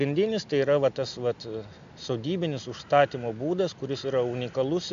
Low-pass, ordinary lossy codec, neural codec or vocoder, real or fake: 7.2 kHz; MP3, 64 kbps; none; real